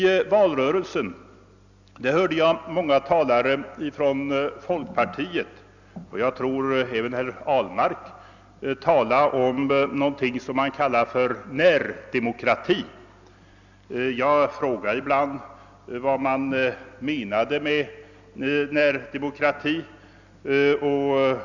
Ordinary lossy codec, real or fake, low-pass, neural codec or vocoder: none; real; 7.2 kHz; none